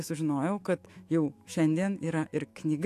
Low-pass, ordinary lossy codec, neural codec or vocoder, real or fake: 14.4 kHz; AAC, 64 kbps; autoencoder, 48 kHz, 128 numbers a frame, DAC-VAE, trained on Japanese speech; fake